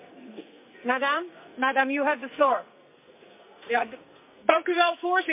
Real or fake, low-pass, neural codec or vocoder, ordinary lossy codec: fake; 3.6 kHz; codec, 44.1 kHz, 2.6 kbps, SNAC; MP3, 32 kbps